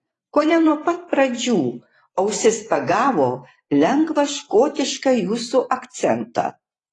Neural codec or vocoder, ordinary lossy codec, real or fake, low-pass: vocoder, 24 kHz, 100 mel bands, Vocos; AAC, 32 kbps; fake; 10.8 kHz